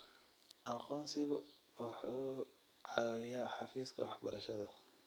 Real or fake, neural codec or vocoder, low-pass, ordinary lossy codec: fake; codec, 44.1 kHz, 2.6 kbps, SNAC; none; none